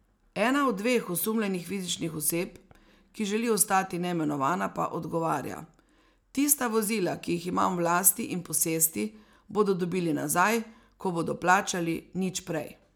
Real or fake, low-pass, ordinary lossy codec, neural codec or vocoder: real; none; none; none